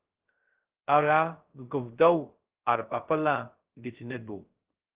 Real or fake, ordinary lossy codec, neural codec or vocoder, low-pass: fake; Opus, 16 kbps; codec, 16 kHz, 0.2 kbps, FocalCodec; 3.6 kHz